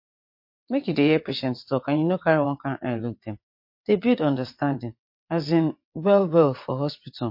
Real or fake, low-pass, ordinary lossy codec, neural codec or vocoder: fake; 5.4 kHz; MP3, 32 kbps; vocoder, 22.05 kHz, 80 mel bands, WaveNeXt